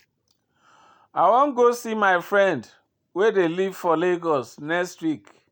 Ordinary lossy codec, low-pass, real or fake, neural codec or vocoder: none; none; real; none